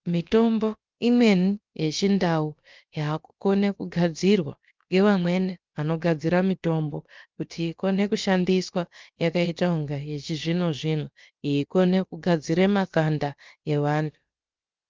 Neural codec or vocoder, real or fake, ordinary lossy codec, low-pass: codec, 16 kHz, about 1 kbps, DyCAST, with the encoder's durations; fake; Opus, 32 kbps; 7.2 kHz